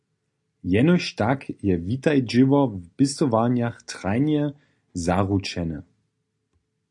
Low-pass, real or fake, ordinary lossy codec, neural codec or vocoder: 10.8 kHz; real; AAC, 64 kbps; none